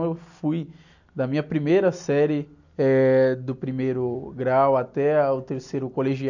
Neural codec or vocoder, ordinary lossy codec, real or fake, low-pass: none; none; real; 7.2 kHz